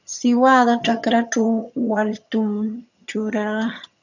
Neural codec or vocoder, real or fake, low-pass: vocoder, 22.05 kHz, 80 mel bands, HiFi-GAN; fake; 7.2 kHz